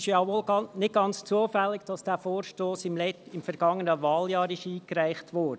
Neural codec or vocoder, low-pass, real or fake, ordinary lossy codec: none; none; real; none